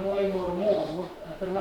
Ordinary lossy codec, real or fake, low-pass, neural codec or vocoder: MP3, 96 kbps; fake; 19.8 kHz; autoencoder, 48 kHz, 32 numbers a frame, DAC-VAE, trained on Japanese speech